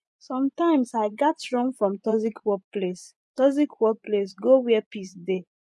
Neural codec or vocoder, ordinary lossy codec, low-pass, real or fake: vocoder, 24 kHz, 100 mel bands, Vocos; none; none; fake